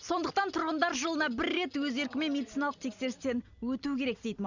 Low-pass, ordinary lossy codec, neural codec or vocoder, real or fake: 7.2 kHz; none; none; real